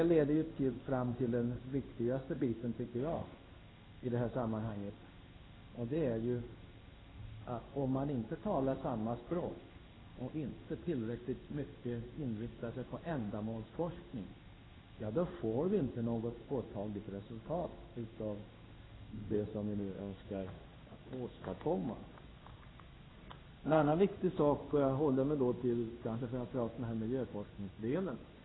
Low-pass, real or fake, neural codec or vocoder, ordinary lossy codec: 7.2 kHz; fake; codec, 16 kHz in and 24 kHz out, 1 kbps, XY-Tokenizer; AAC, 16 kbps